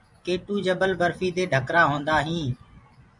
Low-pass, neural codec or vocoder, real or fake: 10.8 kHz; vocoder, 24 kHz, 100 mel bands, Vocos; fake